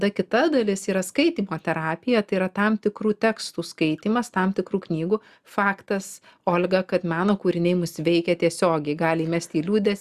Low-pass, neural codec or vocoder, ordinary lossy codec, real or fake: 14.4 kHz; none; Opus, 64 kbps; real